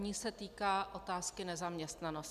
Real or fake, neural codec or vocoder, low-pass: real; none; 14.4 kHz